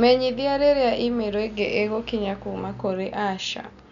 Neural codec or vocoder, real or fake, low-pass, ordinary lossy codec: none; real; 7.2 kHz; none